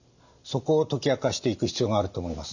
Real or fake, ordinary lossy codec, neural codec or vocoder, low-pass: real; none; none; 7.2 kHz